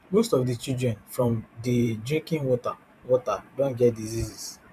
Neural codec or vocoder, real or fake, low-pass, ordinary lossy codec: vocoder, 44.1 kHz, 128 mel bands every 512 samples, BigVGAN v2; fake; 14.4 kHz; none